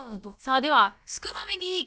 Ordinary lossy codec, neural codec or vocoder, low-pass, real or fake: none; codec, 16 kHz, about 1 kbps, DyCAST, with the encoder's durations; none; fake